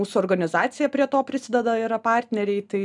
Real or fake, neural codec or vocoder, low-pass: real; none; 10.8 kHz